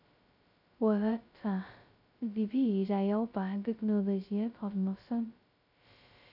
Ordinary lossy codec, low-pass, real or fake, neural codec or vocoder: none; 5.4 kHz; fake; codec, 16 kHz, 0.2 kbps, FocalCodec